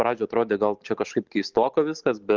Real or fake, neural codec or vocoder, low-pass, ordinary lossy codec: real; none; 7.2 kHz; Opus, 32 kbps